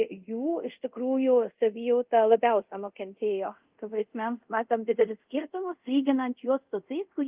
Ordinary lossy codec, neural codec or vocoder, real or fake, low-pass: Opus, 32 kbps; codec, 24 kHz, 0.5 kbps, DualCodec; fake; 3.6 kHz